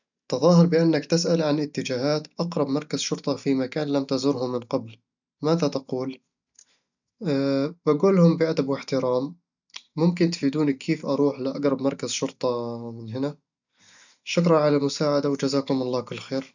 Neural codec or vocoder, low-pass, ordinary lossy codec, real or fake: none; 7.2 kHz; none; real